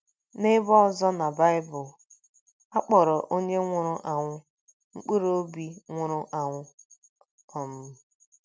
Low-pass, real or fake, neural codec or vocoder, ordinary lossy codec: none; real; none; none